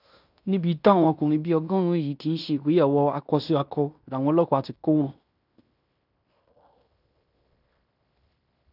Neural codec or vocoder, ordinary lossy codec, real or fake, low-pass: codec, 16 kHz in and 24 kHz out, 0.9 kbps, LongCat-Audio-Codec, fine tuned four codebook decoder; none; fake; 5.4 kHz